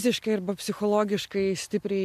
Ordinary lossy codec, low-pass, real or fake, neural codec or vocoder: MP3, 96 kbps; 14.4 kHz; real; none